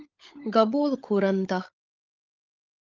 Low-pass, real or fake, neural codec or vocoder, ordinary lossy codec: 7.2 kHz; fake; codec, 16 kHz, 16 kbps, FunCodec, trained on LibriTTS, 50 frames a second; Opus, 24 kbps